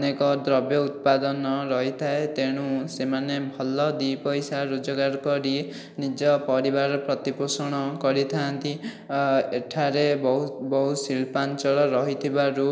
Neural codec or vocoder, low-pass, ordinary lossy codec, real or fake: none; none; none; real